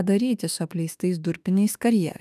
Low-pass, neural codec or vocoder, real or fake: 14.4 kHz; autoencoder, 48 kHz, 32 numbers a frame, DAC-VAE, trained on Japanese speech; fake